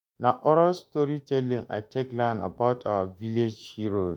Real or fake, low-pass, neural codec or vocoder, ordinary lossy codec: fake; 19.8 kHz; autoencoder, 48 kHz, 32 numbers a frame, DAC-VAE, trained on Japanese speech; MP3, 96 kbps